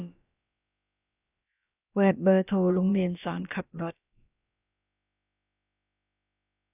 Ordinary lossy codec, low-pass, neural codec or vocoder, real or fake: none; 3.6 kHz; codec, 16 kHz, about 1 kbps, DyCAST, with the encoder's durations; fake